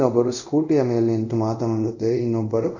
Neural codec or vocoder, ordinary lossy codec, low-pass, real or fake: codec, 24 kHz, 0.5 kbps, DualCodec; none; 7.2 kHz; fake